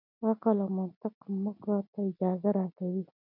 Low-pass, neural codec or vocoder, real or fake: 5.4 kHz; none; real